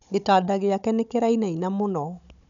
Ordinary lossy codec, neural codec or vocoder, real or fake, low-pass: none; codec, 16 kHz, 16 kbps, FunCodec, trained on Chinese and English, 50 frames a second; fake; 7.2 kHz